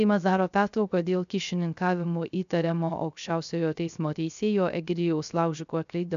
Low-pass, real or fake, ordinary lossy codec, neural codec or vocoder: 7.2 kHz; fake; AAC, 96 kbps; codec, 16 kHz, 0.3 kbps, FocalCodec